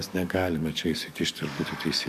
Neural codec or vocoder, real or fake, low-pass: codec, 44.1 kHz, 7.8 kbps, DAC; fake; 14.4 kHz